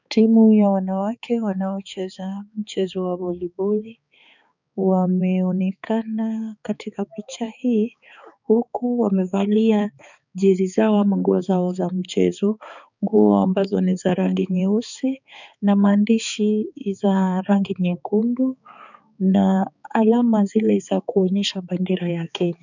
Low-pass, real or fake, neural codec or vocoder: 7.2 kHz; fake; codec, 16 kHz, 4 kbps, X-Codec, HuBERT features, trained on balanced general audio